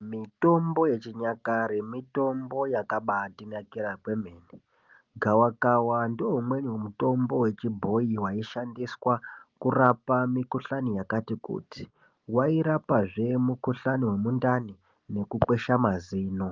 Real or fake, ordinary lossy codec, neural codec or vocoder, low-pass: real; Opus, 24 kbps; none; 7.2 kHz